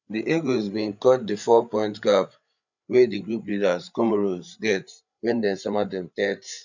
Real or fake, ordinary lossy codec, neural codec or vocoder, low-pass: fake; none; codec, 16 kHz, 8 kbps, FreqCodec, larger model; 7.2 kHz